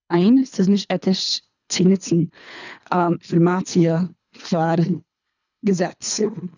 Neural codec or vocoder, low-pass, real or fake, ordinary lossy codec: codec, 24 kHz, 3 kbps, HILCodec; 7.2 kHz; fake; none